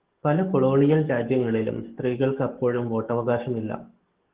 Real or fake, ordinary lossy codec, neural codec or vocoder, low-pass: fake; Opus, 16 kbps; codec, 44.1 kHz, 7.8 kbps, DAC; 3.6 kHz